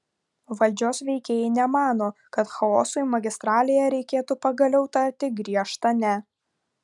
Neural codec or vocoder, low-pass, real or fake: none; 10.8 kHz; real